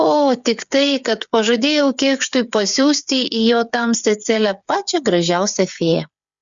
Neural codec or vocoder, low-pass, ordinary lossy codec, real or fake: codec, 16 kHz, 8 kbps, FreqCodec, smaller model; 7.2 kHz; Opus, 64 kbps; fake